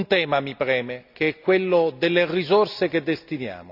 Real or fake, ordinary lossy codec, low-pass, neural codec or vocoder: real; none; 5.4 kHz; none